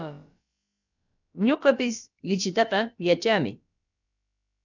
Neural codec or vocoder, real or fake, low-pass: codec, 16 kHz, about 1 kbps, DyCAST, with the encoder's durations; fake; 7.2 kHz